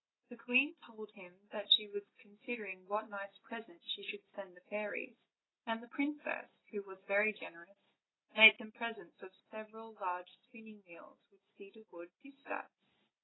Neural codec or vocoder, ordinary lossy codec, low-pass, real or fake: none; AAC, 16 kbps; 7.2 kHz; real